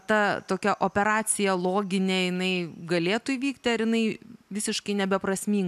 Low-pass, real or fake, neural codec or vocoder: 14.4 kHz; real; none